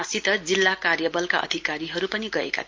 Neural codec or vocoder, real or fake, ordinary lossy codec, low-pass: none; real; Opus, 32 kbps; 7.2 kHz